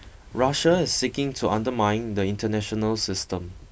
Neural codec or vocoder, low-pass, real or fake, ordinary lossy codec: none; none; real; none